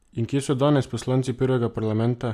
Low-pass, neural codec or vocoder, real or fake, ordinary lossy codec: 14.4 kHz; none; real; none